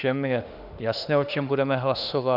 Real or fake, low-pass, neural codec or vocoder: fake; 5.4 kHz; autoencoder, 48 kHz, 32 numbers a frame, DAC-VAE, trained on Japanese speech